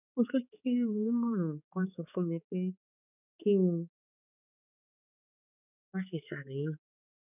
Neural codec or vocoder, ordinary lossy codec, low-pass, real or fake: codec, 16 kHz, 4 kbps, X-Codec, HuBERT features, trained on balanced general audio; none; 3.6 kHz; fake